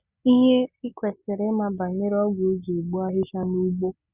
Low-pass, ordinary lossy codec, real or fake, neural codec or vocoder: 3.6 kHz; Opus, 64 kbps; real; none